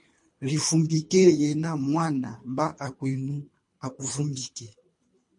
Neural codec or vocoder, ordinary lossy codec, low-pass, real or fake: codec, 24 kHz, 3 kbps, HILCodec; MP3, 48 kbps; 10.8 kHz; fake